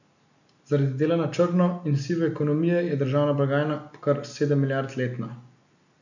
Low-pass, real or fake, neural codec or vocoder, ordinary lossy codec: 7.2 kHz; real; none; none